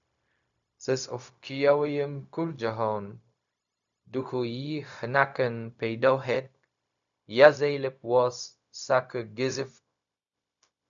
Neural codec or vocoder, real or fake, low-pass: codec, 16 kHz, 0.4 kbps, LongCat-Audio-Codec; fake; 7.2 kHz